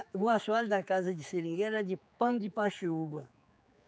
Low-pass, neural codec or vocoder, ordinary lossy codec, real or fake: none; codec, 16 kHz, 4 kbps, X-Codec, HuBERT features, trained on general audio; none; fake